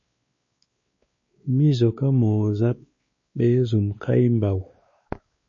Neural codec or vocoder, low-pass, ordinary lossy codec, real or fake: codec, 16 kHz, 2 kbps, X-Codec, WavLM features, trained on Multilingual LibriSpeech; 7.2 kHz; MP3, 32 kbps; fake